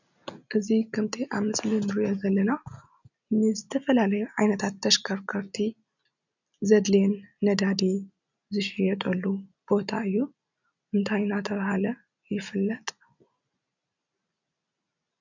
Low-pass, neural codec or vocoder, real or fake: 7.2 kHz; none; real